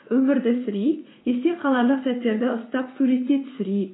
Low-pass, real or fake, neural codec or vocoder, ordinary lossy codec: 7.2 kHz; fake; vocoder, 44.1 kHz, 80 mel bands, Vocos; AAC, 16 kbps